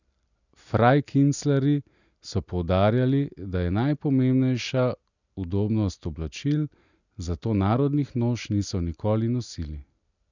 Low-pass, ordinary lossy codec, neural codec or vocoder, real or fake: 7.2 kHz; none; none; real